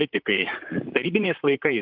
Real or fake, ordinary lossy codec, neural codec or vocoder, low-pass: fake; Opus, 24 kbps; vocoder, 44.1 kHz, 128 mel bands, Pupu-Vocoder; 5.4 kHz